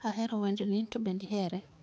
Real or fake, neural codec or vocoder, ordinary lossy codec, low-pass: fake; codec, 16 kHz, 4 kbps, X-Codec, HuBERT features, trained on balanced general audio; none; none